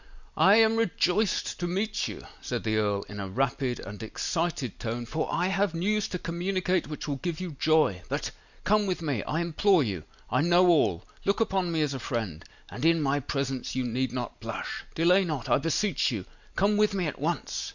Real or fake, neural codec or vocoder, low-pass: real; none; 7.2 kHz